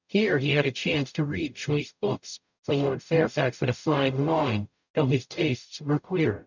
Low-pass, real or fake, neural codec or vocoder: 7.2 kHz; fake; codec, 44.1 kHz, 0.9 kbps, DAC